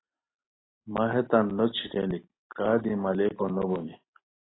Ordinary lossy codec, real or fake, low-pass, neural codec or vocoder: AAC, 16 kbps; real; 7.2 kHz; none